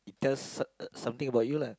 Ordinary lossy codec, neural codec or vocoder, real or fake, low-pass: none; none; real; none